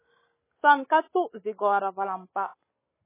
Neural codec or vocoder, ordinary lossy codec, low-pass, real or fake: none; MP3, 16 kbps; 3.6 kHz; real